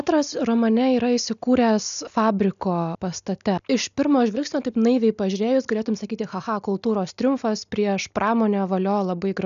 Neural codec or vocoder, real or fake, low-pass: none; real; 7.2 kHz